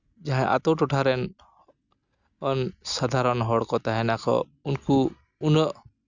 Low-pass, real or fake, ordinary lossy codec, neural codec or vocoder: 7.2 kHz; real; none; none